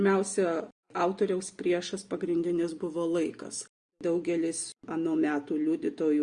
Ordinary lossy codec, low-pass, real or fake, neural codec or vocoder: Opus, 64 kbps; 9.9 kHz; real; none